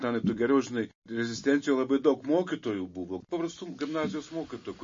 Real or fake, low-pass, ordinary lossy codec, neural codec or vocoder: real; 7.2 kHz; MP3, 32 kbps; none